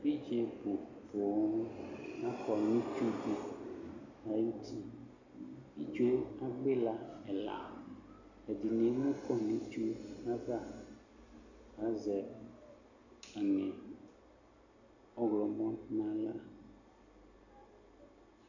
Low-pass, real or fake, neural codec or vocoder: 7.2 kHz; real; none